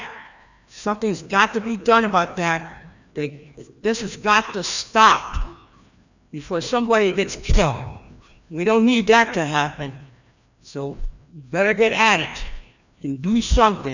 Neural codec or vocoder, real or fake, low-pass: codec, 16 kHz, 1 kbps, FreqCodec, larger model; fake; 7.2 kHz